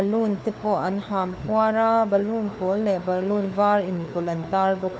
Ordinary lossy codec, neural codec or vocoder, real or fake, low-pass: none; codec, 16 kHz, 4 kbps, FunCodec, trained on LibriTTS, 50 frames a second; fake; none